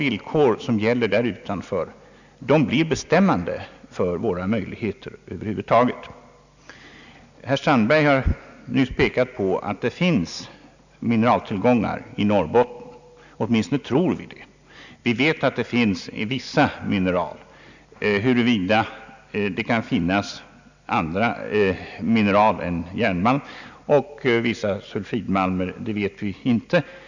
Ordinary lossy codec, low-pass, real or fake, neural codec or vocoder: none; 7.2 kHz; real; none